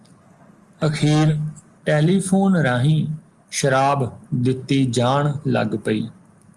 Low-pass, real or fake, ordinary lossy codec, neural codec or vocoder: 10.8 kHz; real; Opus, 32 kbps; none